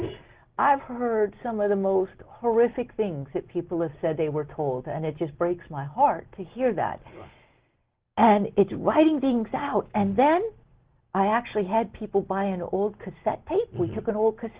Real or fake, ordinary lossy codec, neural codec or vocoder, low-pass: real; Opus, 16 kbps; none; 3.6 kHz